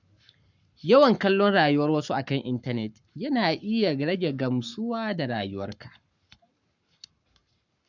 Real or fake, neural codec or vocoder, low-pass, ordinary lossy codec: fake; codec, 44.1 kHz, 7.8 kbps, Pupu-Codec; 7.2 kHz; none